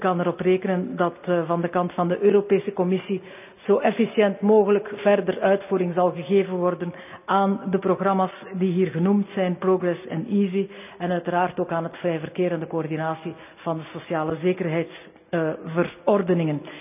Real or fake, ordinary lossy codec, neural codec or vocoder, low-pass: real; none; none; 3.6 kHz